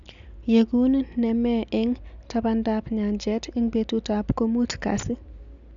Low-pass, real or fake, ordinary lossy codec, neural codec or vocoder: 7.2 kHz; real; none; none